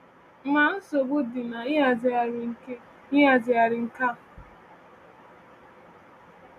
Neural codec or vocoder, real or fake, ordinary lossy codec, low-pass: none; real; none; 14.4 kHz